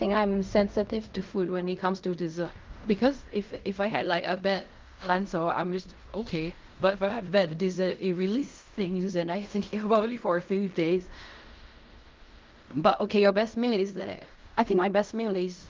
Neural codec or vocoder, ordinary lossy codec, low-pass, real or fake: codec, 16 kHz in and 24 kHz out, 0.4 kbps, LongCat-Audio-Codec, fine tuned four codebook decoder; Opus, 24 kbps; 7.2 kHz; fake